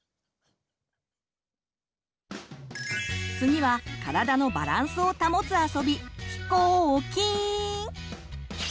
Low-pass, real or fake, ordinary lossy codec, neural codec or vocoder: none; real; none; none